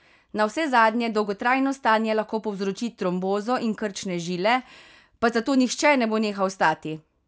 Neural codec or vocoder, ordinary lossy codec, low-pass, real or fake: none; none; none; real